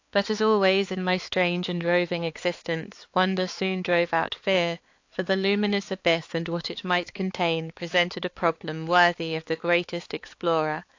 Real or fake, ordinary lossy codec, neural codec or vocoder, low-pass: fake; AAC, 48 kbps; codec, 16 kHz, 4 kbps, X-Codec, HuBERT features, trained on balanced general audio; 7.2 kHz